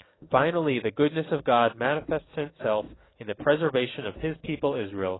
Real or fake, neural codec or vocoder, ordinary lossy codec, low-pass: fake; codec, 44.1 kHz, 7.8 kbps, DAC; AAC, 16 kbps; 7.2 kHz